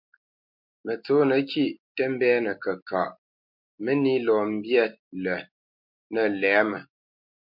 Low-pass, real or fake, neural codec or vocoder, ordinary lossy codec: 5.4 kHz; real; none; MP3, 48 kbps